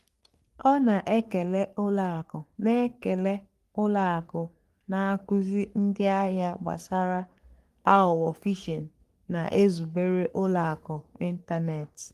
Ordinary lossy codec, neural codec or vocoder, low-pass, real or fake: Opus, 24 kbps; codec, 44.1 kHz, 3.4 kbps, Pupu-Codec; 14.4 kHz; fake